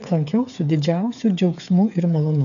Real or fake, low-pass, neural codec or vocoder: fake; 7.2 kHz; codec, 16 kHz, 8 kbps, FreqCodec, smaller model